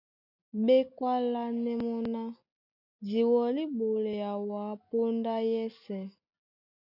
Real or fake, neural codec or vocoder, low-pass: real; none; 5.4 kHz